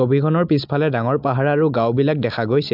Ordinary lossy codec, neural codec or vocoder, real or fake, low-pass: AAC, 48 kbps; none; real; 5.4 kHz